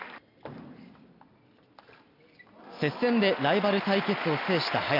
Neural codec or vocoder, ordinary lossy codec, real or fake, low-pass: none; none; real; 5.4 kHz